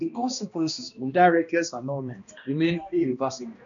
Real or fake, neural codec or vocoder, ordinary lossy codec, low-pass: fake; codec, 16 kHz, 1 kbps, X-Codec, HuBERT features, trained on balanced general audio; none; 7.2 kHz